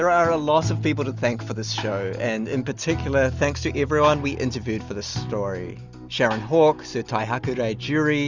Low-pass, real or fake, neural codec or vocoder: 7.2 kHz; real; none